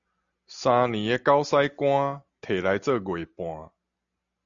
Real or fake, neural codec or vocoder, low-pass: real; none; 7.2 kHz